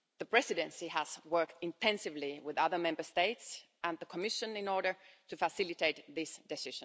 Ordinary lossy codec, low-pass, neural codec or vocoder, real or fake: none; none; none; real